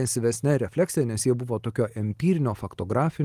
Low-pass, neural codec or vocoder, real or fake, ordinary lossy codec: 14.4 kHz; none; real; Opus, 24 kbps